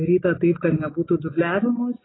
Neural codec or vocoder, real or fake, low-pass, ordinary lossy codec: none; real; 7.2 kHz; AAC, 16 kbps